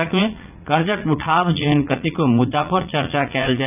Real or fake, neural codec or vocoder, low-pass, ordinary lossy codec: fake; vocoder, 44.1 kHz, 80 mel bands, Vocos; 3.6 kHz; none